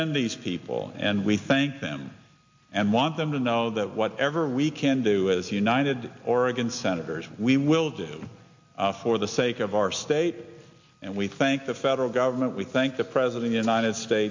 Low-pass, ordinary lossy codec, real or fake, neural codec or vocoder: 7.2 kHz; MP3, 48 kbps; real; none